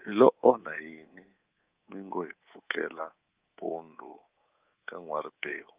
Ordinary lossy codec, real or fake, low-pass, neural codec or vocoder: Opus, 32 kbps; fake; 3.6 kHz; codec, 24 kHz, 3.1 kbps, DualCodec